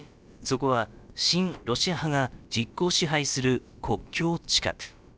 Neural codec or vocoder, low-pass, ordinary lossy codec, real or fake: codec, 16 kHz, about 1 kbps, DyCAST, with the encoder's durations; none; none; fake